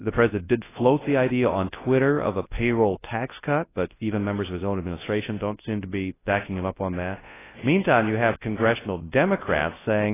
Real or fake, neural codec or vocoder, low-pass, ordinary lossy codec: fake; codec, 24 kHz, 0.9 kbps, WavTokenizer, large speech release; 3.6 kHz; AAC, 16 kbps